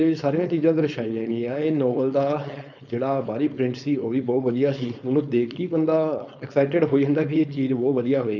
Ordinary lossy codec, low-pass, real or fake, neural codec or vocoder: none; 7.2 kHz; fake; codec, 16 kHz, 4.8 kbps, FACodec